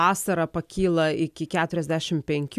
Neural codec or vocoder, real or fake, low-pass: none; real; 14.4 kHz